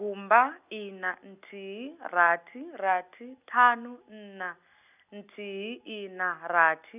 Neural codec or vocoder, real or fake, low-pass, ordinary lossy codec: none; real; 3.6 kHz; none